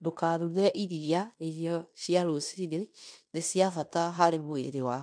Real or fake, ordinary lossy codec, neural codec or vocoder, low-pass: fake; MP3, 96 kbps; codec, 16 kHz in and 24 kHz out, 0.9 kbps, LongCat-Audio-Codec, fine tuned four codebook decoder; 9.9 kHz